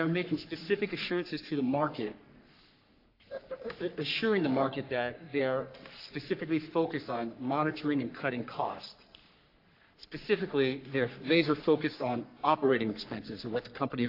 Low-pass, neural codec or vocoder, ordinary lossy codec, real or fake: 5.4 kHz; codec, 44.1 kHz, 3.4 kbps, Pupu-Codec; AAC, 32 kbps; fake